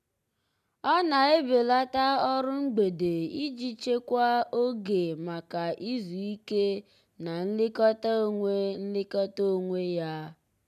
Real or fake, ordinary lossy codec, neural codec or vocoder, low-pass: real; none; none; 14.4 kHz